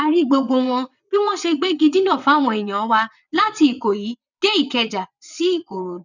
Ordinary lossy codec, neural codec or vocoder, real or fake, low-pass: none; vocoder, 22.05 kHz, 80 mel bands, WaveNeXt; fake; 7.2 kHz